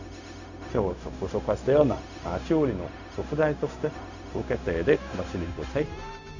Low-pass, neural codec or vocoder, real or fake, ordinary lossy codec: 7.2 kHz; codec, 16 kHz, 0.4 kbps, LongCat-Audio-Codec; fake; Opus, 64 kbps